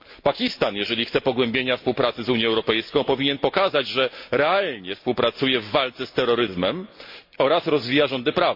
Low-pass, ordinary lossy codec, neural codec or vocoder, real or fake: 5.4 kHz; MP3, 32 kbps; none; real